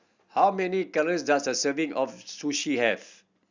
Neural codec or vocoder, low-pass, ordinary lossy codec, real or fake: none; 7.2 kHz; Opus, 64 kbps; real